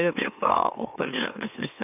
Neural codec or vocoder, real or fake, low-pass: autoencoder, 44.1 kHz, a latent of 192 numbers a frame, MeloTTS; fake; 3.6 kHz